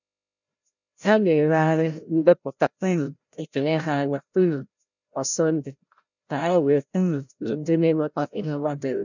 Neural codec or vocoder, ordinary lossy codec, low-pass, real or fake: codec, 16 kHz, 0.5 kbps, FreqCodec, larger model; none; 7.2 kHz; fake